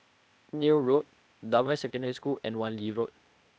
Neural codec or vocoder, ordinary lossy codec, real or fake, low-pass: codec, 16 kHz, 0.8 kbps, ZipCodec; none; fake; none